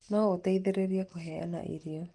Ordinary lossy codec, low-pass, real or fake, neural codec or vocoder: Opus, 24 kbps; 10.8 kHz; real; none